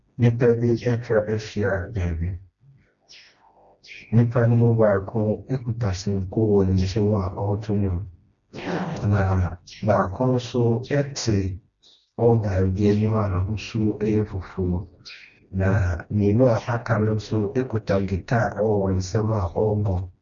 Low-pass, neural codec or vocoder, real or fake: 7.2 kHz; codec, 16 kHz, 1 kbps, FreqCodec, smaller model; fake